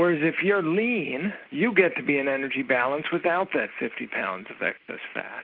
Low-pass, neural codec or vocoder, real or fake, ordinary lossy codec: 5.4 kHz; none; real; Opus, 24 kbps